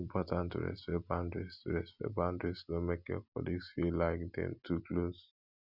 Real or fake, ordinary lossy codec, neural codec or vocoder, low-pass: real; none; none; 5.4 kHz